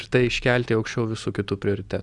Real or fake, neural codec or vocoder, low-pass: real; none; 10.8 kHz